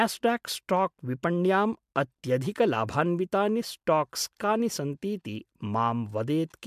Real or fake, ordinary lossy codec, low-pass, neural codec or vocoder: real; MP3, 96 kbps; 14.4 kHz; none